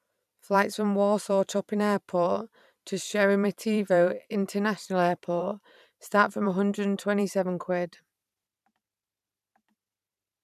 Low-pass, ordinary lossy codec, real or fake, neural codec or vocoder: 14.4 kHz; none; fake; vocoder, 44.1 kHz, 128 mel bands every 512 samples, BigVGAN v2